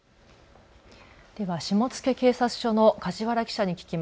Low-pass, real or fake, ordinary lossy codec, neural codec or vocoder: none; real; none; none